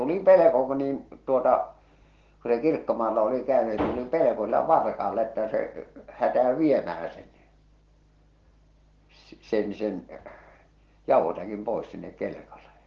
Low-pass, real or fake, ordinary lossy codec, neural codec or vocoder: 7.2 kHz; real; Opus, 16 kbps; none